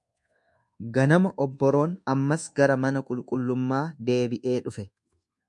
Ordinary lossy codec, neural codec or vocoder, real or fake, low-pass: MP3, 64 kbps; codec, 24 kHz, 1.2 kbps, DualCodec; fake; 10.8 kHz